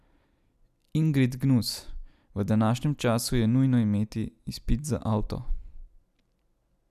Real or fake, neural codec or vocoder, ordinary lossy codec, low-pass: real; none; none; 14.4 kHz